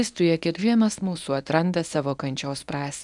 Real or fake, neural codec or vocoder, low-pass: fake; codec, 24 kHz, 0.9 kbps, WavTokenizer, medium speech release version 1; 10.8 kHz